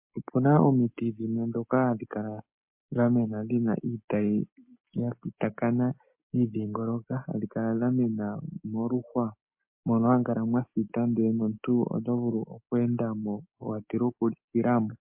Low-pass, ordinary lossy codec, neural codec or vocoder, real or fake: 3.6 kHz; MP3, 32 kbps; none; real